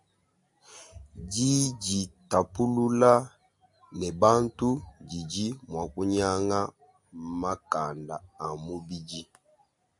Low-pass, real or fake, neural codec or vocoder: 10.8 kHz; real; none